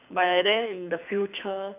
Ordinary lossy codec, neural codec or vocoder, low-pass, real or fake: none; codec, 24 kHz, 6 kbps, HILCodec; 3.6 kHz; fake